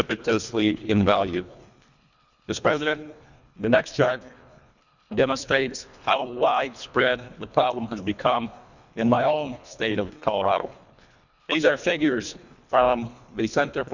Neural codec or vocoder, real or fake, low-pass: codec, 24 kHz, 1.5 kbps, HILCodec; fake; 7.2 kHz